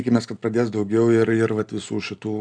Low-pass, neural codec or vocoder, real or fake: 9.9 kHz; none; real